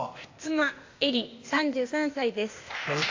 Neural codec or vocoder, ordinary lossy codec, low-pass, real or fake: codec, 16 kHz, 0.8 kbps, ZipCodec; AAC, 48 kbps; 7.2 kHz; fake